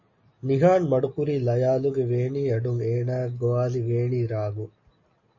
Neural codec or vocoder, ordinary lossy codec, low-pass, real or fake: none; MP3, 32 kbps; 7.2 kHz; real